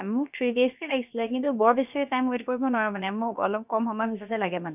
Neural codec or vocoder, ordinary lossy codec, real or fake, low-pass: codec, 16 kHz, about 1 kbps, DyCAST, with the encoder's durations; none; fake; 3.6 kHz